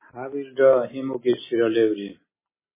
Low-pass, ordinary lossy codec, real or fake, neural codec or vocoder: 3.6 kHz; MP3, 16 kbps; real; none